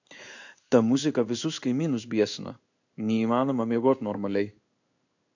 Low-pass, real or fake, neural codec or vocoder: 7.2 kHz; fake; codec, 16 kHz in and 24 kHz out, 1 kbps, XY-Tokenizer